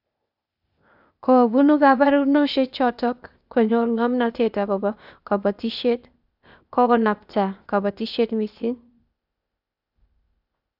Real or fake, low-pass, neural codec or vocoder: fake; 5.4 kHz; codec, 16 kHz, 0.8 kbps, ZipCodec